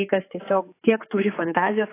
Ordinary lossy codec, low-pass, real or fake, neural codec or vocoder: AAC, 24 kbps; 3.6 kHz; fake; codec, 16 kHz, 4 kbps, X-Codec, HuBERT features, trained on general audio